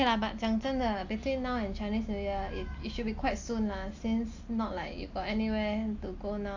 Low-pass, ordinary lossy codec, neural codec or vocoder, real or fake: 7.2 kHz; AAC, 48 kbps; none; real